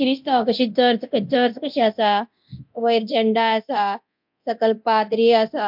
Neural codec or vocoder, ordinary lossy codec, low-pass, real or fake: codec, 24 kHz, 0.9 kbps, DualCodec; MP3, 48 kbps; 5.4 kHz; fake